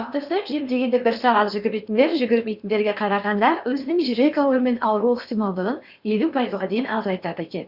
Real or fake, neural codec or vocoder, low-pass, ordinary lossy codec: fake; codec, 16 kHz in and 24 kHz out, 0.8 kbps, FocalCodec, streaming, 65536 codes; 5.4 kHz; Opus, 64 kbps